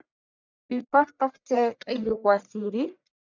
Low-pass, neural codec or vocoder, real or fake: 7.2 kHz; codec, 44.1 kHz, 1.7 kbps, Pupu-Codec; fake